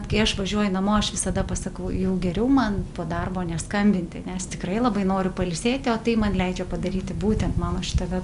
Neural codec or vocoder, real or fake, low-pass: none; real; 10.8 kHz